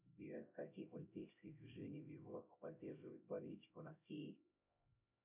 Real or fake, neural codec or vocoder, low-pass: fake; codec, 16 kHz, 0.5 kbps, X-Codec, HuBERT features, trained on LibriSpeech; 3.6 kHz